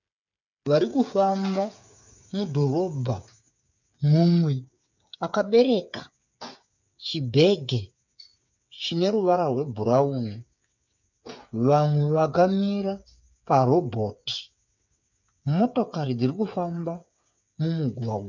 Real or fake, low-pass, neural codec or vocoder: fake; 7.2 kHz; codec, 16 kHz, 8 kbps, FreqCodec, smaller model